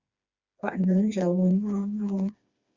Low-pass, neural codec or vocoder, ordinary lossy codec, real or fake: 7.2 kHz; codec, 16 kHz, 2 kbps, FreqCodec, smaller model; Opus, 64 kbps; fake